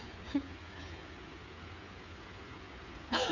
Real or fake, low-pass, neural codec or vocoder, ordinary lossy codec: fake; 7.2 kHz; codec, 16 kHz, 8 kbps, FreqCodec, smaller model; none